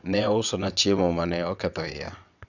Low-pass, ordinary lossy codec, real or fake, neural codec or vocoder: 7.2 kHz; none; fake; vocoder, 22.05 kHz, 80 mel bands, WaveNeXt